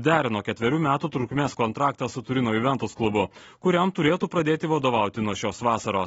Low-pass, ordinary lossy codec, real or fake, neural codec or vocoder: 19.8 kHz; AAC, 24 kbps; real; none